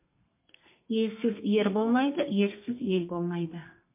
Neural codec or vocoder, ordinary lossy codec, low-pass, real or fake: codec, 32 kHz, 1.9 kbps, SNAC; MP3, 24 kbps; 3.6 kHz; fake